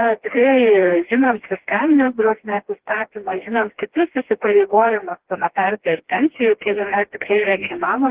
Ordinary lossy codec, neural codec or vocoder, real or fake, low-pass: Opus, 16 kbps; codec, 16 kHz, 1 kbps, FreqCodec, smaller model; fake; 3.6 kHz